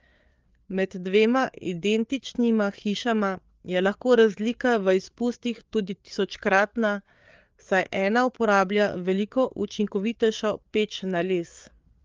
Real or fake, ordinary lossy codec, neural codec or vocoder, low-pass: fake; Opus, 32 kbps; codec, 16 kHz, 4 kbps, FreqCodec, larger model; 7.2 kHz